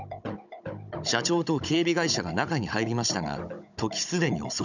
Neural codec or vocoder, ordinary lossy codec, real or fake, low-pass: codec, 16 kHz, 16 kbps, FunCodec, trained on Chinese and English, 50 frames a second; none; fake; 7.2 kHz